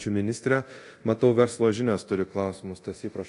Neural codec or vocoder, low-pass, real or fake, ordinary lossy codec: codec, 24 kHz, 0.9 kbps, DualCodec; 10.8 kHz; fake; AAC, 48 kbps